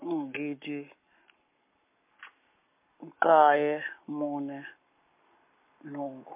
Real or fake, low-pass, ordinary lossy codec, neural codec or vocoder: fake; 3.6 kHz; MP3, 32 kbps; codec, 44.1 kHz, 7.8 kbps, Pupu-Codec